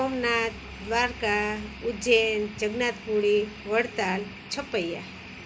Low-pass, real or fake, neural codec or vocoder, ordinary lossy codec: none; real; none; none